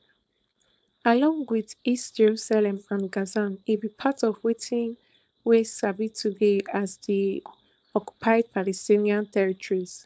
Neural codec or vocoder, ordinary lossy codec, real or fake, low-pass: codec, 16 kHz, 4.8 kbps, FACodec; none; fake; none